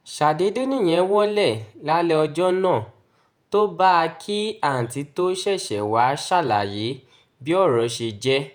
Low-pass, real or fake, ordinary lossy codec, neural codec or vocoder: none; fake; none; vocoder, 48 kHz, 128 mel bands, Vocos